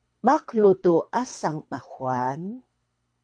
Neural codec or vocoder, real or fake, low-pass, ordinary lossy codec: codec, 24 kHz, 3 kbps, HILCodec; fake; 9.9 kHz; MP3, 64 kbps